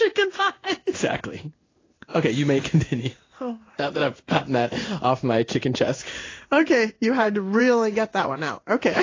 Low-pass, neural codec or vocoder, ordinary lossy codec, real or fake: 7.2 kHz; codec, 16 kHz in and 24 kHz out, 1 kbps, XY-Tokenizer; AAC, 32 kbps; fake